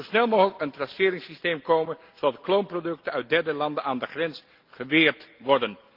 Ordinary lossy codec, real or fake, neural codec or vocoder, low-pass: Opus, 32 kbps; real; none; 5.4 kHz